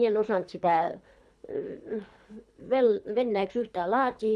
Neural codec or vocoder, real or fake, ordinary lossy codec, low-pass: codec, 24 kHz, 3 kbps, HILCodec; fake; none; none